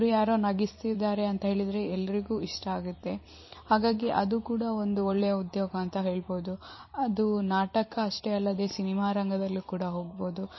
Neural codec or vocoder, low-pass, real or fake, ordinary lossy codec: vocoder, 44.1 kHz, 128 mel bands every 256 samples, BigVGAN v2; 7.2 kHz; fake; MP3, 24 kbps